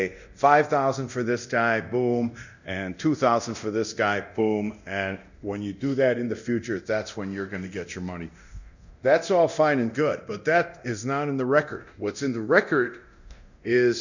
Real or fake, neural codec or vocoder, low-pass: fake; codec, 24 kHz, 0.9 kbps, DualCodec; 7.2 kHz